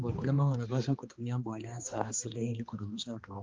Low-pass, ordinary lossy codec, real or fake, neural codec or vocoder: 7.2 kHz; Opus, 32 kbps; fake; codec, 16 kHz, 2 kbps, X-Codec, HuBERT features, trained on balanced general audio